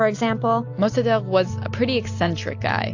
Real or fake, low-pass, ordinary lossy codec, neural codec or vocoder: real; 7.2 kHz; AAC, 48 kbps; none